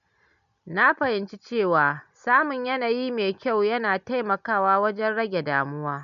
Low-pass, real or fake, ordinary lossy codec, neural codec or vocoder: 7.2 kHz; real; none; none